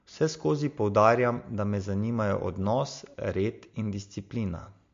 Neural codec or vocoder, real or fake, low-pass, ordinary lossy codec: none; real; 7.2 kHz; MP3, 48 kbps